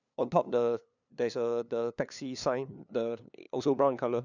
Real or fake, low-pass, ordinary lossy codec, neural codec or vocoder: fake; 7.2 kHz; none; codec, 16 kHz, 2 kbps, FunCodec, trained on LibriTTS, 25 frames a second